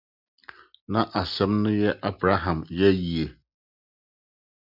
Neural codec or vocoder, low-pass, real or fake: none; 5.4 kHz; real